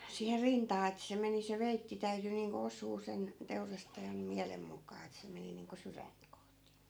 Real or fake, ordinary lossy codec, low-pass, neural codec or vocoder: real; none; none; none